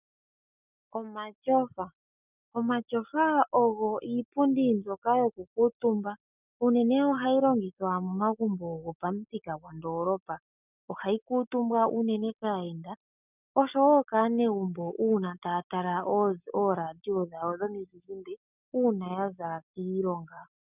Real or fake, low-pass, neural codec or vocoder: real; 3.6 kHz; none